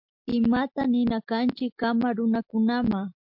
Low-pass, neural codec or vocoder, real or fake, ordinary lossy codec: 5.4 kHz; none; real; MP3, 48 kbps